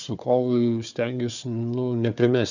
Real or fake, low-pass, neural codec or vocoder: fake; 7.2 kHz; codec, 16 kHz, 4 kbps, FunCodec, trained on Chinese and English, 50 frames a second